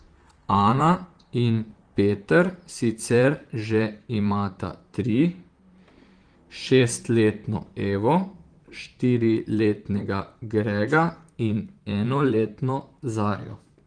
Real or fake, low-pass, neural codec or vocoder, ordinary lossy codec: fake; 9.9 kHz; vocoder, 44.1 kHz, 128 mel bands, Pupu-Vocoder; Opus, 24 kbps